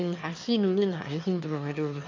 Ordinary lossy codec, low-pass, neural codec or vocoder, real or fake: MP3, 48 kbps; 7.2 kHz; autoencoder, 22.05 kHz, a latent of 192 numbers a frame, VITS, trained on one speaker; fake